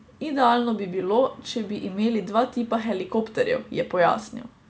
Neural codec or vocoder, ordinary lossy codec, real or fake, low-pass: none; none; real; none